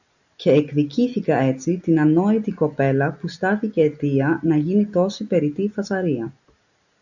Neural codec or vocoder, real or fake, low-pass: none; real; 7.2 kHz